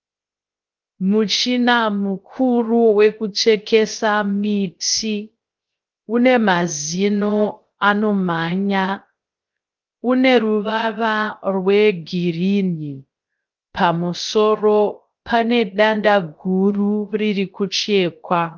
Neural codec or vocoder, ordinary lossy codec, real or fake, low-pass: codec, 16 kHz, 0.3 kbps, FocalCodec; Opus, 24 kbps; fake; 7.2 kHz